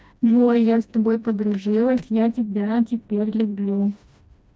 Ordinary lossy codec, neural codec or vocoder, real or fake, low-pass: none; codec, 16 kHz, 1 kbps, FreqCodec, smaller model; fake; none